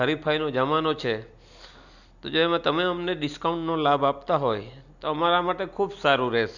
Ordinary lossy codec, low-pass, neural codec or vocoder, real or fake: none; 7.2 kHz; none; real